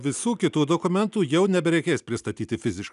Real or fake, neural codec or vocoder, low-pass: real; none; 10.8 kHz